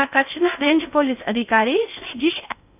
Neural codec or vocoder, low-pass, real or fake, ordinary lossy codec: codec, 16 kHz in and 24 kHz out, 0.6 kbps, FocalCodec, streaming, 4096 codes; 3.6 kHz; fake; none